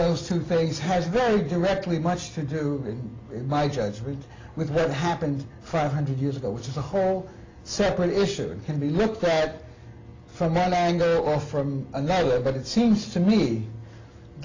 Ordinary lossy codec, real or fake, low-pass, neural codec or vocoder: AAC, 32 kbps; real; 7.2 kHz; none